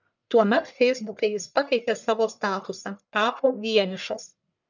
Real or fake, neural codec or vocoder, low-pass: fake; codec, 44.1 kHz, 1.7 kbps, Pupu-Codec; 7.2 kHz